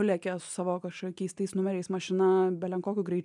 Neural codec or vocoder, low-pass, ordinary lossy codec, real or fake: none; 10.8 kHz; MP3, 96 kbps; real